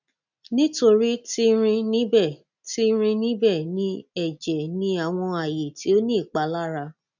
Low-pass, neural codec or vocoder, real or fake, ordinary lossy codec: 7.2 kHz; none; real; none